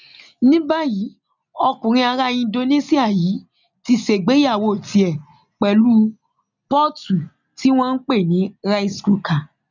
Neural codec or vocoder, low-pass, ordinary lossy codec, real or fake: none; 7.2 kHz; none; real